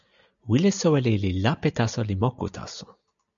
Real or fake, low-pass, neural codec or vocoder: real; 7.2 kHz; none